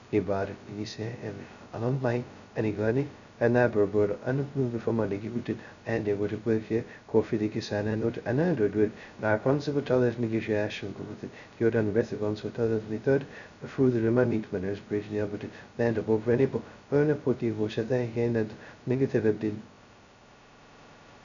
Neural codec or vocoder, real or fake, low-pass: codec, 16 kHz, 0.2 kbps, FocalCodec; fake; 7.2 kHz